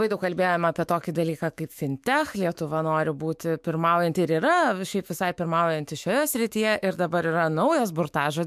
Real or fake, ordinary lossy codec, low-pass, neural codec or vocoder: fake; MP3, 96 kbps; 14.4 kHz; autoencoder, 48 kHz, 128 numbers a frame, DAC-VAE, trained on Japanese speech